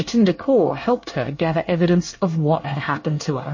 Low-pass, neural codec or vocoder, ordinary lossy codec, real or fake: 7.2 kHz; codec, 24 kHz, 1 kbps, SNAC; MP3, 32 kbps; fake